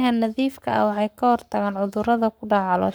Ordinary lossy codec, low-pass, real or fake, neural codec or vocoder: none; none; fake; codec, 44.1 kHz, 7.8 kbps, Pupu-Codec